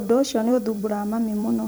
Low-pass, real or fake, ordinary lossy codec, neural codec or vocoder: none; fake; none; vocoder, 44.1 kHz, 128 mel bands every 256 samples, BigVGAN v2